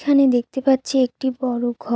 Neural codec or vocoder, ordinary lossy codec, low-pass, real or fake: none; none; none; real